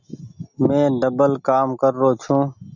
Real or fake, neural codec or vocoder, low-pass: real; none; 7.2 kHz